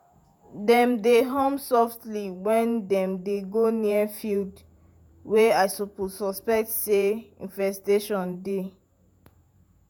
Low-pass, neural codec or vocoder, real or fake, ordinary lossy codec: none; vocoder, 48 kHz, 128 mel bands, Vocos; fake; none